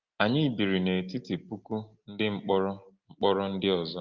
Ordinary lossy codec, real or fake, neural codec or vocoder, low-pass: Opus, 32 kbps; real; none; 7.2 kHz